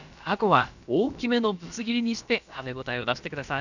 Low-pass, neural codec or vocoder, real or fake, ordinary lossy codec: 7.2 kHz; codec, 16 kHz, about 1 kbps, DyCAST, with the encoder's durations; fake; none